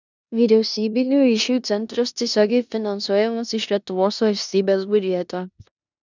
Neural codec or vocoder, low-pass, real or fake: codec, 16 kHz in and 24 kHz out, 0.9 kbps, LongCat-Audio-Codec, four codebook decoder; 7.2 kHz; fake